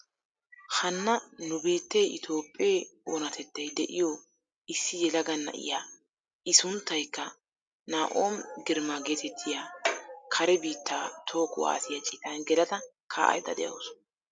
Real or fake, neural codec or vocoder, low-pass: real; none; 9.9 kHz